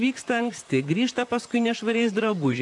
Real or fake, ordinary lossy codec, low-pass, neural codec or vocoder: fake; AAC, 64 kbps; 10.8 kHz; vocoder, 44.1 kHz, 128 mel bands, Pupu-Vocoder